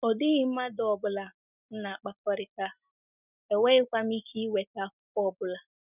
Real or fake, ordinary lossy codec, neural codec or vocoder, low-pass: real; none; none; 3.6 kHz